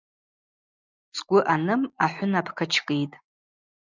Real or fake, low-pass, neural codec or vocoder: real; 7.2 kHz; none